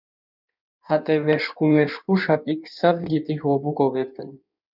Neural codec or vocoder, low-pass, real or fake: codec, 16 kHz in and 24 kHz out, 1.1 kbps, FireRedTTS-2 codec; 5.4 kHz; fake